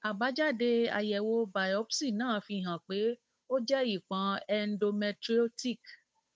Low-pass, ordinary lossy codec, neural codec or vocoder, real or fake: none; none; codec, 16 kHz, 8 kbps, FunCodec, trained on Chinese and English, 25 frames a second; fake